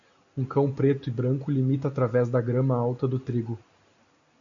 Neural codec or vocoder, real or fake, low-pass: none; real; 7.2 kHz